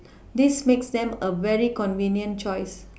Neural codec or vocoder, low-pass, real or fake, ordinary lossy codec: none; none; real; none